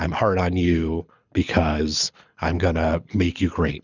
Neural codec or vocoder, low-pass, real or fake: codec, 24 kHz, 6 kbps, HILCodec; 7.2 kHz; fake